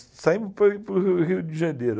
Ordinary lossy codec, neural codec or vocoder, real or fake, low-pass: none; none; real; none